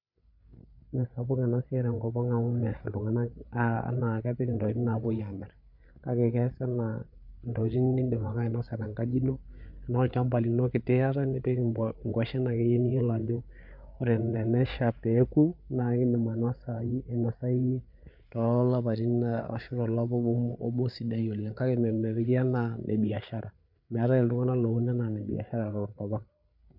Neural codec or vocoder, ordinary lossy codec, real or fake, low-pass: codec, 16 kHz, 8 kbps, FreqCodec, larger model; none; fake; 5.4 kHz